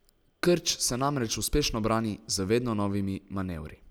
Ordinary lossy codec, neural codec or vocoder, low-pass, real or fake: none; none; none; real